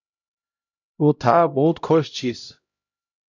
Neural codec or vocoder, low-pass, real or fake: codec, 16 kHz, 0.5 kbps, X-Codec, HuBERT features, trained on LibriSpeech; 7.2 kHz; fake